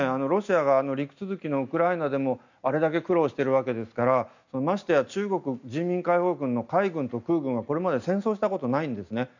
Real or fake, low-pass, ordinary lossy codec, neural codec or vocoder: real; 7.2 kHz; none; none